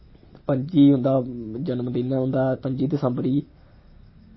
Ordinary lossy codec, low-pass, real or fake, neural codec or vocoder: MP3, 24 kbps; 7.2 kHz; real; none